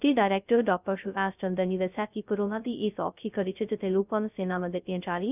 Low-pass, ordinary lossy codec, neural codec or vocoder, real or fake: 3.6 kHz; none; codec, 16 kHz, 0.2 kbps, FocalCodec; fake